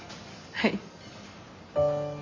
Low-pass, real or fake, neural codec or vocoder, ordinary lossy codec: 7.2 kHz; real; none; MP3, 32 kbps